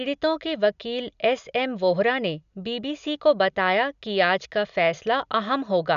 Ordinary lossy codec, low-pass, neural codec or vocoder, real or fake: none; 7.2 kHz; none; real